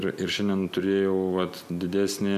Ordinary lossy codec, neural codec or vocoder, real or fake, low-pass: AAC, 96 kbps; none; real; 14.4 kHz